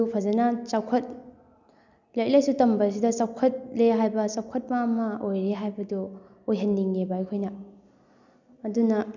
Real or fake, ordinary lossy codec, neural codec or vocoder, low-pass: real; none; none; 7.2 kHz